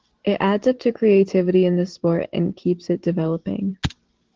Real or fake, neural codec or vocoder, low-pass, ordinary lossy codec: fake; vocoder, 44.1 kHz, 128 mel bands every 512 samples, BigVGAN v2; 7.2 kHz; Opus, 16 kbps